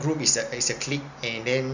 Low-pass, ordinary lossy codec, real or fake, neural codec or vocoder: 7.2 kHz; none; real; none